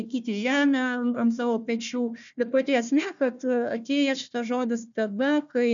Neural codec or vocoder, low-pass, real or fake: codec, 16 kHz, 1 kbps, FunCodec, trained on Chinese and English, 50 frames a second; 7.2 kHz; fake